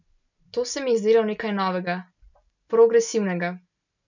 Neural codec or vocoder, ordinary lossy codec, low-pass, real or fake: none; none; 7.2 kHz; real